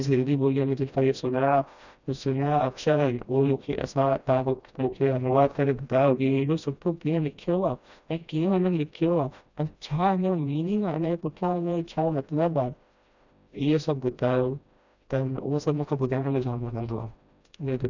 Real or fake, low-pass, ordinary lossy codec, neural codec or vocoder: fake; 7.2 kHz; none; codec, 16 kHz, 1 kbps, FreqCodec, smaller model